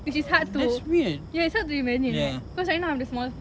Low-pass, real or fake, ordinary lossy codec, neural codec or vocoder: none; real; none; none